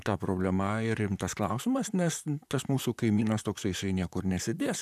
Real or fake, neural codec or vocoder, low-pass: fake; vocoder, 44.1 kHz, 128 mel bands, Pupu-Vocoder; 14.4 kHz